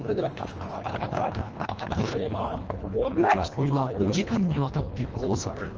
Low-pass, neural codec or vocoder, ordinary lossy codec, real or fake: 7.2 kHz; codec, 24 kHz, 1.5 kbps, HILCodec; Opus, 24 kbps; fake